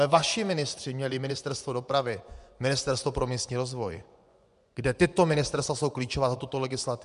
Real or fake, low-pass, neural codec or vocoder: fake; 10.8 kHz; vocoder, 24 kHz, 100 mel bands, Vocos